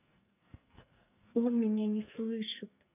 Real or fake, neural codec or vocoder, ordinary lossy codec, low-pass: fake; codec, 44.1 kHz, 2.6 kbps, SNAC; none; 3.6 kHz